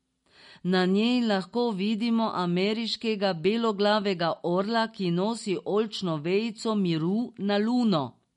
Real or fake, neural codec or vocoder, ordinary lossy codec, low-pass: real; none; MP3, 48 kbps; 19.8 kHz